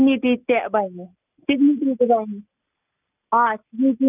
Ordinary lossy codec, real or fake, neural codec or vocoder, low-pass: none; real; none; 3.6 kHz